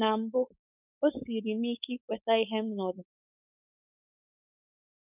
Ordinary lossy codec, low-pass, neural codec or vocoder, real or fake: none; 3.6 kHz; codec, 16 kHz, 4.8 kbps, FACodec; fake